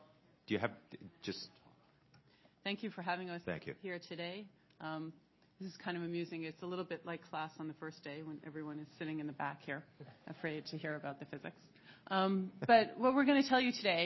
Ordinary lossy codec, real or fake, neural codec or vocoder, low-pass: MP3, 24 kbps; real; none; 7.2 kHz